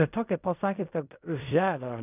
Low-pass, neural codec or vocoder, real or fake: 3.6 kHz; codec, 16 kHz in and 24 kHz out, 0.4 kbps, LongCat-Audio-Codec, fine tuned four codebook decoder; fake